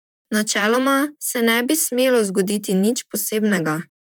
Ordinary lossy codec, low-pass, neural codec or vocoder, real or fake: none; none; vocoder, 44.1 kHz, 128 mel bands, Pupu-Vocoder; fake